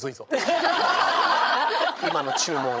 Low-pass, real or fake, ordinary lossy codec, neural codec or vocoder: none; fake; none; codec, 16 kHz, 16 kbps, FreqCodec, larger model